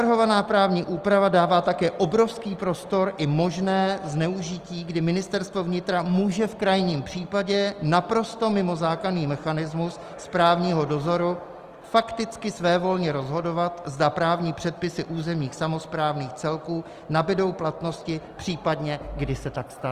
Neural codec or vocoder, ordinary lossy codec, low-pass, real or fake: none; Opus, 24 kbps; 14.4 kHz; real